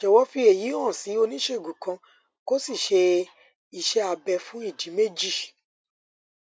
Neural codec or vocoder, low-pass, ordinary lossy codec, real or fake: none; none; none; real